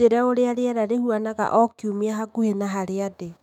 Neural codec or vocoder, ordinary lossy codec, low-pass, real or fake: autoencoder, 48 kHz, 128 numbers a frame, DAC-VAE, trained on Japanese speech; none; 19.8 kHz; fake